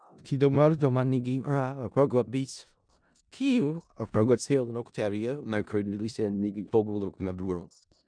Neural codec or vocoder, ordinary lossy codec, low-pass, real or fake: codec, 16 kHz in and 24 kHz out, 0.4 kbps, LongCat-Audio-Codec, four codebook decoder; none; 9.9 kHz; fake